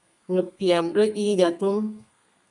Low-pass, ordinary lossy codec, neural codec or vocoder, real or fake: 10.8 kHz; MP3, 96 kbps; codec, 32 kHz, 1.9 kbps, SNAC; fake